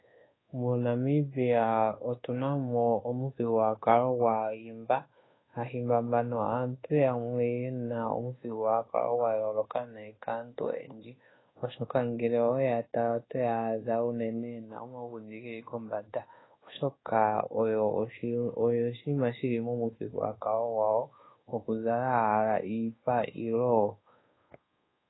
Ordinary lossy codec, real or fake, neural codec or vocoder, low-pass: AAC, 16 kbps; fake; codec, 24 kHz, 1.2 kbps, DualCodec; 7.2 kHz